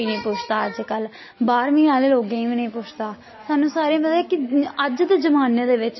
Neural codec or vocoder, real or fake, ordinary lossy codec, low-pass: none; real; MP3, 24 kbps; 7.2 kHz